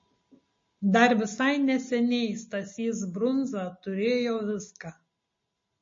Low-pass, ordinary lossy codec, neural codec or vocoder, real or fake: 7.2 kHz; MP3, 32 kbps; none; real